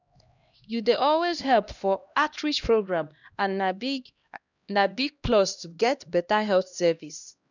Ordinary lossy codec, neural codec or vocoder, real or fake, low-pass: none; codec, 16 kHz, 1 kbps, X-Codec, HuBERT features, trained on LibriSpeech; fake; 7.2 kHz